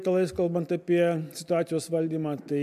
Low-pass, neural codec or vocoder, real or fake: 14.4 kHz; none; real